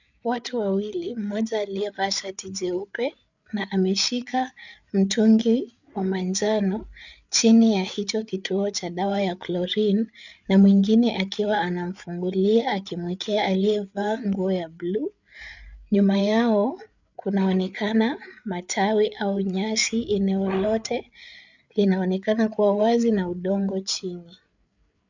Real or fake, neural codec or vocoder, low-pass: fake; codec, 16 kHz, 8 kbps, FreqCodec, larger model; 7.2 kHz